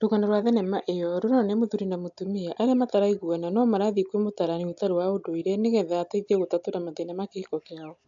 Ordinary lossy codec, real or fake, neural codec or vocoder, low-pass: AAC, 64 kbps; real; none; 7.2 kHz